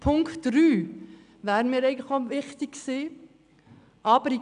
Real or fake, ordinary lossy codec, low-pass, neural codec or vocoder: real; none; 9.9 kHz; none